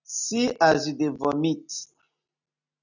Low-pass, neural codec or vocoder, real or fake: 7.2 kHz; none; real